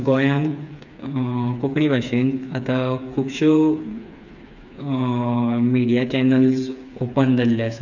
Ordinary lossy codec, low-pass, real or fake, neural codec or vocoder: none; 7.2 kHz; fake; codec, 16 kHz, 4 kbps, FreqCodec, smaller model